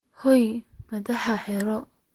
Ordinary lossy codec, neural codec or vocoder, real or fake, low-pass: Opus, 16 kbps; vocoder, 44.1 kHz, 128 mel bands, Pupu-Vocoder; fake; 19.8 kHz